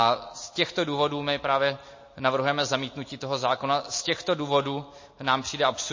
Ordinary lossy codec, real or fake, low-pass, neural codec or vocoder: MP3, 32 kbps; real; 7.2 kHz; none